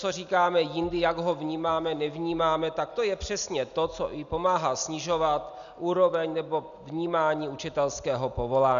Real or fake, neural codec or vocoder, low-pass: real; none; 7.2 kHz